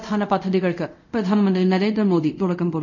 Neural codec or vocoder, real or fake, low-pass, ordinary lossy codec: codec, 24 kHz, 0.5 kbps, DualCodec; fake; 7.2 kHz; none